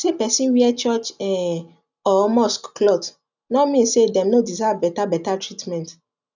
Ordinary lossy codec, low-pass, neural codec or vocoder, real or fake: none; 7.2 kHz; none; real